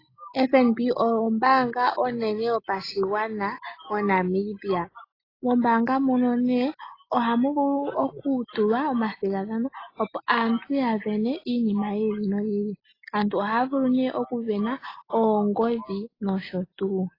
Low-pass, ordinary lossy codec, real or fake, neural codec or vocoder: 5.4 kHz; AAC, 24 kbps; real; none